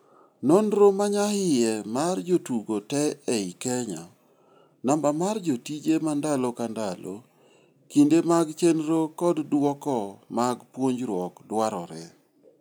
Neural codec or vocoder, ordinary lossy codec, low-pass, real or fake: none; none; none; real